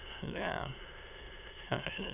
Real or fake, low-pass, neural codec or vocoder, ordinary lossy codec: fake; 3.6 kHz; autoencoder, 22.05 kHz, a latent of 192 numbers a frame, VITS, trained on many speakers; none